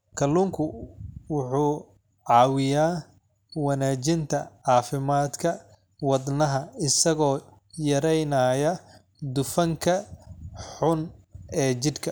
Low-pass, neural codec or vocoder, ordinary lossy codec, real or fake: none; none; none; real